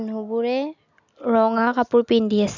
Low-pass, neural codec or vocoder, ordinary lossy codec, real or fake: 7.2 kHz; none; none; real